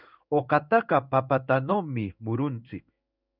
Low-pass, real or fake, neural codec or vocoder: 5.4 kHz; fake; codec, 16 kHz in and 24 kHz out, 1 kbps, XY-Tokenizer